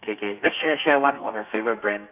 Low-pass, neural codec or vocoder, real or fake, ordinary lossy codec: 3.6 kHz; codec, 32 kHz, 1.9 kbps, SNAC; fake; none